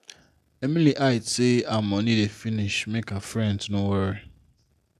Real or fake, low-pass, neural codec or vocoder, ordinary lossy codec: real; 14.4 kHz; none; none